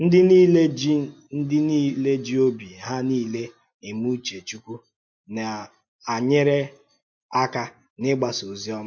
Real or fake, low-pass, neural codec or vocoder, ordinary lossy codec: real; 7.2 kHz; none; MP3, 48 kbps